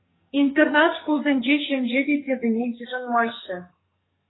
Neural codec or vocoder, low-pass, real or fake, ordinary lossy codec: codec, 44.1 kHz, 2.6 kbps, SNAC; 7.2 kHz; fake; AAC, 16 kbps